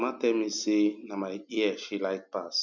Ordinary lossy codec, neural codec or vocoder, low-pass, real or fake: none; none; 7.2 kHz; real